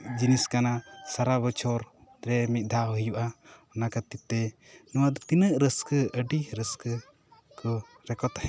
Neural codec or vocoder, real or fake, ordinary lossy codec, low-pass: none; real; none; none